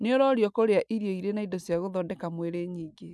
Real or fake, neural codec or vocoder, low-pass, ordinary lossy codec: real; none; none; none